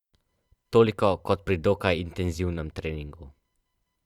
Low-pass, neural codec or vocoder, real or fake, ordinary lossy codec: 19.8 kHz; vocoder, 44.1 kHz, 128 mel bands every 512 samples, BigVGAN v2; fake; Opus, 64 kbps